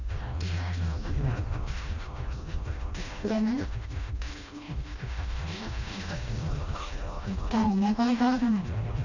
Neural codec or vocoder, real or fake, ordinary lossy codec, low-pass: codec, 16 kHz, 1 kbps, FreqCodec, smaller model; fake; none; 7.2 kHz